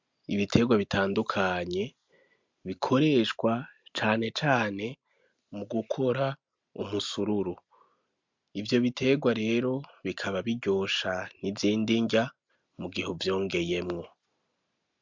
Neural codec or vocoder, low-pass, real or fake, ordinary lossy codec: none; 7.2 kHz; real; MP3, 64 kbps